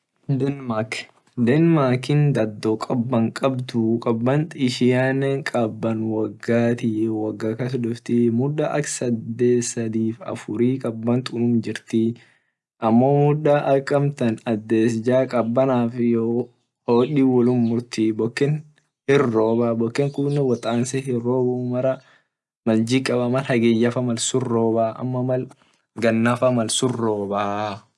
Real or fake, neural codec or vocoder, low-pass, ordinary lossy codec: real; none; none; none